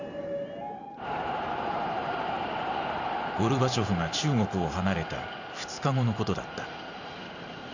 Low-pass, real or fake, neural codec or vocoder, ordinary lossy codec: 7.2 kHz; fake; vocoder, 44.1 kHz, 128 mel bands every 512 samples, BigVGAN v2; none